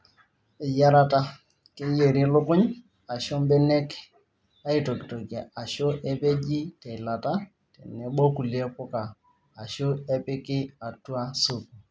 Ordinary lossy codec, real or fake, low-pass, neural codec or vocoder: none; real; none; none